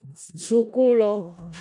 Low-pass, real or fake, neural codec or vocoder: 10.8 kHz; fake; codec, 16 kHz in and 24 kHz out, 0.4 kbps, LongCat-Audio-Codec, four codebook decoder